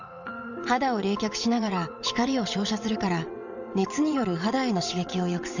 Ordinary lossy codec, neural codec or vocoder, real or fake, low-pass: none; vocoder, 22.05 kHz, 80 mel bands, WaveNeXt; fake; 7.2 kHz